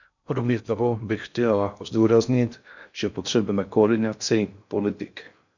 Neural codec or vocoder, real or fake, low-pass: codec, 16 kHz in and 24 kHz out, 0.6 kbps, FocalCodec, streaming, 2048 codes; fake; 7.2 kHz